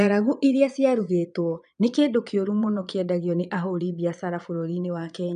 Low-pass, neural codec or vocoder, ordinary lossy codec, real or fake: 9.9 kHz; vocoder, 22.05 kHz, 80 mel bands, Vocos; none; fake